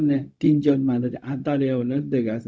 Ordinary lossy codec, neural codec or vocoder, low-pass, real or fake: none; codec, 16 kHz, 0.4 kbps, LongCat-Audio-Codec; none; fake